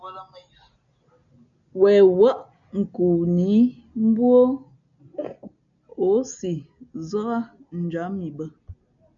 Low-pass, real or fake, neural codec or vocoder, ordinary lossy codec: 7.2 kHz; real; none; MP3, 96 kbps